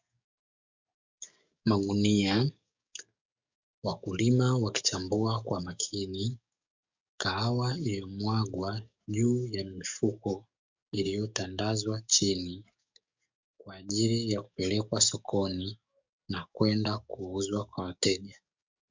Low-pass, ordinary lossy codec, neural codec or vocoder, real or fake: 7.2 kHz; MP3, 64 kbps; codec, 44.1 kHz, 7.8 kbps, DAC; fake